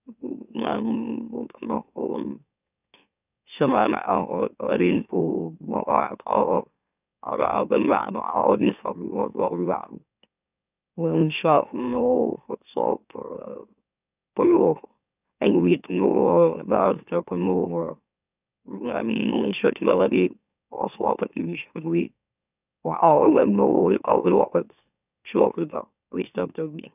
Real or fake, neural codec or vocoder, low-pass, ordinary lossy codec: fake; autoencoder, 44.1 kHz, a latent of 192 numbers a frame, MeloTTS; 3.6 kHz; none